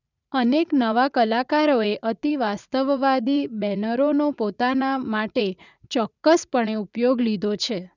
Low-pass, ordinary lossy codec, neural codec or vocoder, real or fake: 7.2 kHz; none; vocoder, 44.1 kHz, 80 mel bands, Vocos; fake